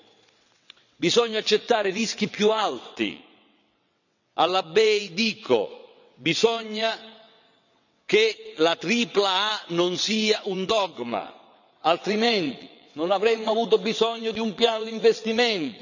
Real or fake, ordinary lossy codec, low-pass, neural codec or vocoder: fake; none; 7.2 kHz; vocoder, 22.05 kHz, 80 mel bands, WaveNeXt